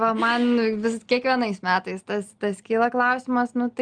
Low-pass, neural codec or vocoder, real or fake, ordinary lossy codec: 9.9 kHz; none; real; Opus, 32 kbps